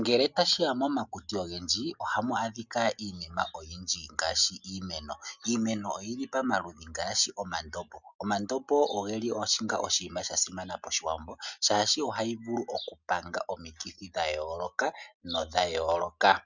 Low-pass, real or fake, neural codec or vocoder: 7.2 kHz; real; none